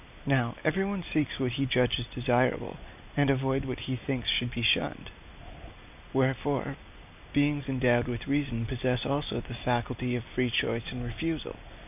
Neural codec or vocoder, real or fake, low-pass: none; real; 3.6 kHz